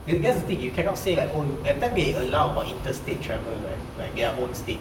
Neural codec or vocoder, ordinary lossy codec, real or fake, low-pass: vocoder, 44.1 kHz, 128 mel bands, Pupu-Vocoder; none; fake; 19.8 kHz